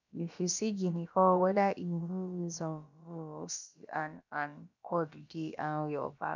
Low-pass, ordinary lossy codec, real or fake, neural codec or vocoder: 7.2 kHz; none; fake; codec, 16 kHz, about 1 kbps, DyCAST, with the encoder's durations